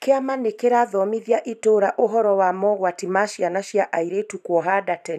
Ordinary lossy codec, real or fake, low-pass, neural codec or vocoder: none; fake; 14.4 kHz; vocoder, 44.1 kHz, 128 mel bands every 512 samples, BigVGAN v2